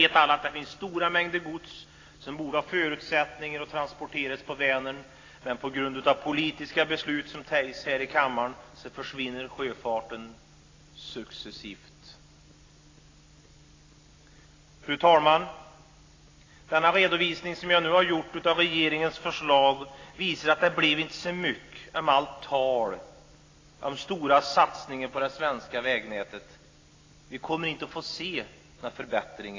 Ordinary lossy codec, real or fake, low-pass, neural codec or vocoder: AAC, 32 kbps; real; 7.2 kHz; none